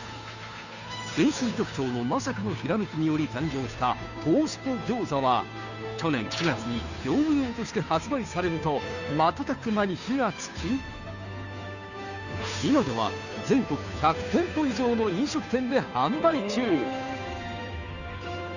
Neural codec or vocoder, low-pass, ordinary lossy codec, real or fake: codec, 16 kHz, 2 kbps, FunCodec, trained on Chinese and English, 25 frames a second; 7.2 kHz; none; fake